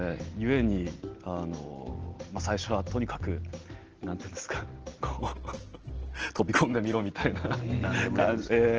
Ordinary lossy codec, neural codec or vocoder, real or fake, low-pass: Opus, 16 kbps; none; real; 7.2 kHz